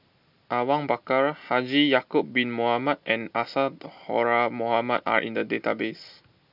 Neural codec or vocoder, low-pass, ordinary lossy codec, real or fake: none; 5.4 kHz; none; real